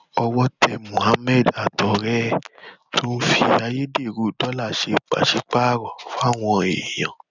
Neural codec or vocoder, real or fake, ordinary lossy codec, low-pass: none; real; none; 7.2 kHz